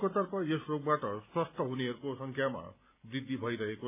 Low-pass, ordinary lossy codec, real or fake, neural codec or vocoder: 3.6 kHz; AAC, 32 kbps; real; none